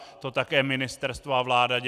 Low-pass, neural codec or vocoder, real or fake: 14.4 kHz; none; real